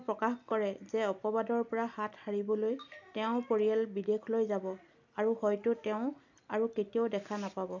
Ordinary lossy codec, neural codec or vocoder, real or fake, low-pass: none; none; real; 7.2 kHz